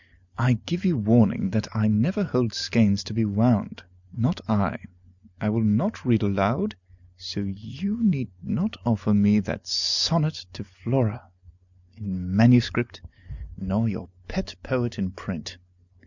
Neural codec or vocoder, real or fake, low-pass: none; real; 7.2 kHz